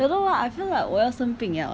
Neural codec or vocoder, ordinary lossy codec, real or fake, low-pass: none; none; real; none